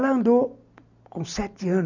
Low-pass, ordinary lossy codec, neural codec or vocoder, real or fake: 7.2 kHz; none; none; real